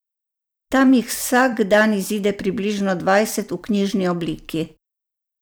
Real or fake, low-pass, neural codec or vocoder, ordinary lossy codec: fake; none; vocoder, 44.1 kHz, 128 mel bands every 256 samples, BigVGAN v2; none